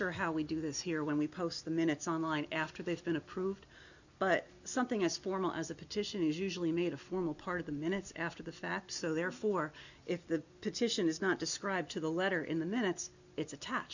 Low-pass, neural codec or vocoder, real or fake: 7.2 kHz; none; real